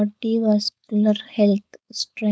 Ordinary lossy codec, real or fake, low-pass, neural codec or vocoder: none; fake; none; codec, 16 kHz, 4.8 kbps, FACodec